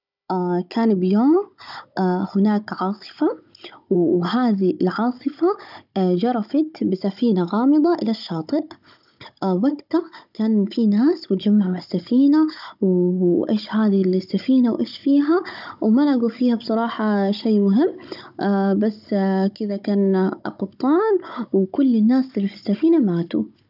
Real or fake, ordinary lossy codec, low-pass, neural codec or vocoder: fake; none; 5.4 kHz; codec, 16 kHz, 16 kbps, FunCodec, trained on Chinese and English, 50 frames a second